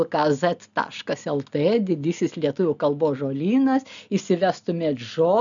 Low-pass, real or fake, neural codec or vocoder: 7.2 kHz; real; none